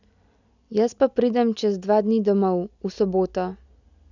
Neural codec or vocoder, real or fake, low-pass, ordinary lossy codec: none; real; 7.2 kHz; none